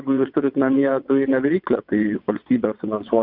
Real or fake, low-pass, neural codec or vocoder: fake; 5.4 kHz; vocoder, 22.05 kHz, 80 mel bands, WaveNeXt